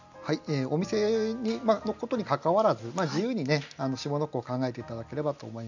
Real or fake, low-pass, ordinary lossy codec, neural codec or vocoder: real; 7.2 kHz; none; none